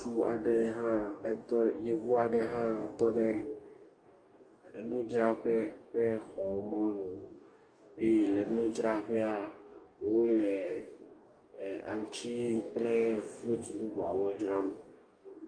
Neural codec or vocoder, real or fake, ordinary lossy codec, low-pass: codec, 44.1 kHz, 2.6 kbps, DAC; fake; Opus, 32 kbps; 9.9 kHz